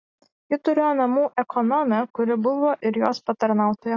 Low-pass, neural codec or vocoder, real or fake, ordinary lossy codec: 7.2 kHz; none; real; AAC, 32 kbps